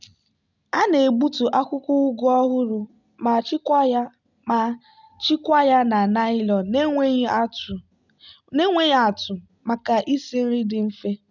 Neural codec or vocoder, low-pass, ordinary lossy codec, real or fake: none; 7.2 kHz; none; real